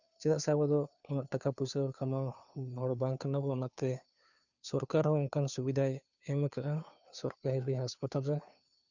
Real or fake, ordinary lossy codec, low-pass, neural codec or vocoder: fake; none; 7.2 kHz; codec, 16 kHz, 2 kbps, FunCodec, trained on Chinese and English, 25 frames a second